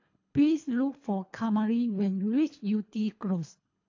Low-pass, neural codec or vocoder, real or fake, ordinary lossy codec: 7.2 kHz; codec, 24 kHz, 3 kbps, HILCodec; fake; AAC, 48 kbps